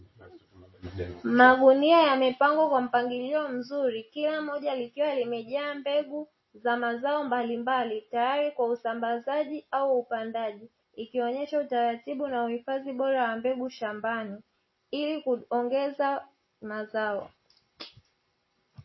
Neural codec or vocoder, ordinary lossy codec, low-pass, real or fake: none; MP3, 24 kbps; 7.2 kHz; real